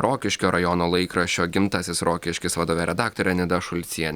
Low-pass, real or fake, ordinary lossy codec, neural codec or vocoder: 19.8 kHz; real; Opus, 64 kbps; none